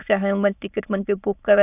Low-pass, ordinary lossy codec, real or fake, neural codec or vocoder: 3.6 kHz; none; fake; autoencoder, 22.05 kHz, a latent of 192 numbers a frame, VITS, trained on many speakers